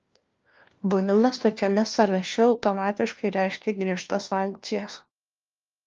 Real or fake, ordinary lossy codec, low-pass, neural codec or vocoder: fake; Opus, 32 kbps; 7.2 kHz; codec, 16 kHz, 1 kbps, FunCodec, trained on LibriTTS, 50 frames a second